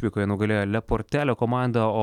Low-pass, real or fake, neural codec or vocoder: 19.8 kHz; real; none